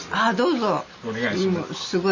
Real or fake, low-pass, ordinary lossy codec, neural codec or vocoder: real; 7.2 kHz; Opus, 64 kbps; none